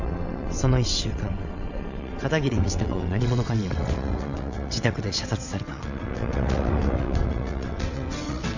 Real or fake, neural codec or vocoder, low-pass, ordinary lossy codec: fake; vocoder, 22.05 kHz, 80 mel bands, WaveNeXt; 7.2 kHz; none